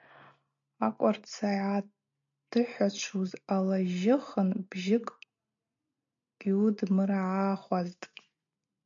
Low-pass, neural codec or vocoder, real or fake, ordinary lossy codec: 7.2 kHz; none; real; MP3, 48 kbps